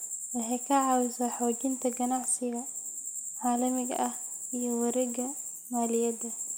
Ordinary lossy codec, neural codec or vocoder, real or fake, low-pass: none; none; real; none